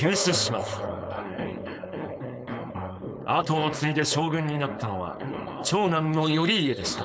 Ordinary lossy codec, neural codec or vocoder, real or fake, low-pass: none; codec, 16 kHz, 4.8 kbps, FACodec; fake; none